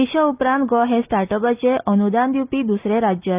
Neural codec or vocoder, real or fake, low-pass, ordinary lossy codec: none; real; 3.6 kHz; Opus, 32 kbps